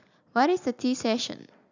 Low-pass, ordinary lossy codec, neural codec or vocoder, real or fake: 7.2 kHz; none; none; real